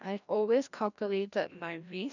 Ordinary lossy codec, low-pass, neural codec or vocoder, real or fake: none; 7.2 kHz; codec, 16 kHz, 1 kbps, FreqCodec, larger model; fake